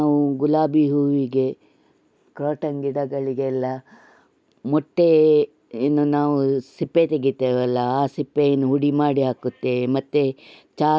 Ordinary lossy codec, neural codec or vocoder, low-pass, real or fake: none; none; none; real